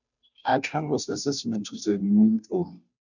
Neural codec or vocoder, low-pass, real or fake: codec, 16 kHz, 0.5 kbps, FunCodec, trained on Chinese and English, 25 frames a second; 7.2 kHz; fake